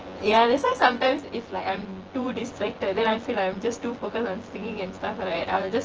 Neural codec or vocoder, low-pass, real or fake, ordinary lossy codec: vocoder, 24 kHz, 100 mel bands, Vocos; 7.2 kHz; fake; Opus, 16 kbps